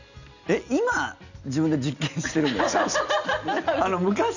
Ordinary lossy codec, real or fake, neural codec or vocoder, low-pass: none; real; none; 7.2 kHz